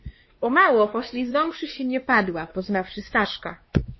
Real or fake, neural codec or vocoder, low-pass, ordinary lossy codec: fake; codec, 16 kHz, 2 kbps, X-Codec, WavLM features, trained on Multilingual LibriSpeech; 7.2 kHz; MP3, 24 kbps